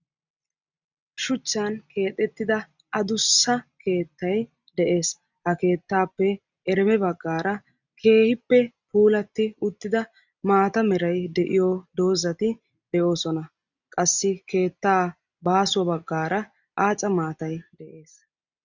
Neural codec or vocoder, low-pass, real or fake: none; 7.2 kHz; real